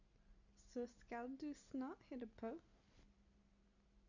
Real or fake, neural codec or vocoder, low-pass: real; none; 7.2 kHz